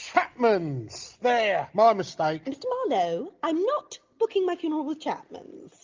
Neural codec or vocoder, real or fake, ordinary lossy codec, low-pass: none; real; Opus, 24 kbps; 7.2 kHz